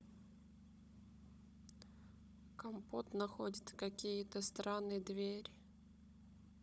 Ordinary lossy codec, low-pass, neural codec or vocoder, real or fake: none; none; codec, 16 kHz, 16 kbps, FunCodec, trained on Chinese and English, 50 frames a second; fake